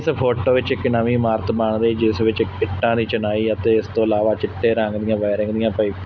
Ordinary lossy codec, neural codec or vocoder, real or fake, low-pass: none; none; real; none